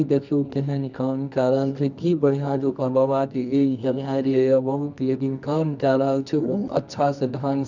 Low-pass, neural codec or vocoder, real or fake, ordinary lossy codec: 7.2 kHz; codec, 24 kHz, 0.9 kbps, WavTokenizer, medium music audio release; fake; none